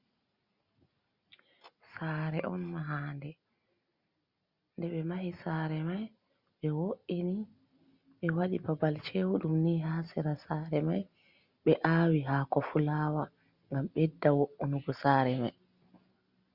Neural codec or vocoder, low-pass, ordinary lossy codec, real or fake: none; 5.4 kHz; Opus, 64 kbps; real